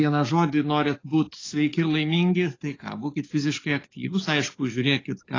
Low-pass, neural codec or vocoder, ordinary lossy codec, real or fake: 7.2 kHz; codec, 44.1 kHz, 7.8 kbps, DAC; AAC, 32 kbps; fake